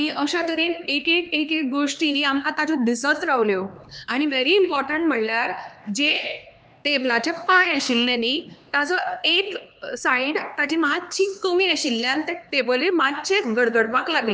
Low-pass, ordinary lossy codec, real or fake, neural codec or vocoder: none; none; fake; codec, 16 kHz, 2 kbps, X-Codec, HuBERT features, trained on LibriSpeech